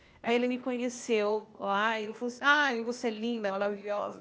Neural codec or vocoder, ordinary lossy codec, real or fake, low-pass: codec, 16 kHz, 0.8 kbps, ZipCodec; none; fake; none